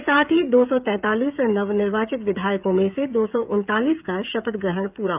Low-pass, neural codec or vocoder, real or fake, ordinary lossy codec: 3.6 kHz; codec, 16 kHz, 16 kbps, FreqCodec, smaller model; fake; none